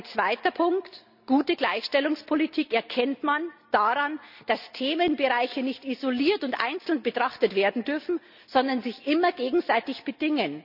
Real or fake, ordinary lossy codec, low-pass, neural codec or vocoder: real; none; 5.4 kHz; none